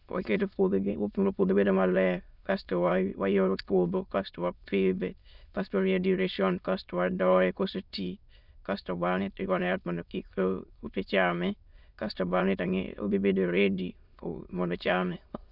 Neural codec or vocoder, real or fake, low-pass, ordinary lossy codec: autoencoder, 22.05 kHz, a latent of 192 numbers a frame, VITS, trained on many speakers; fake; 5.4 kHz; none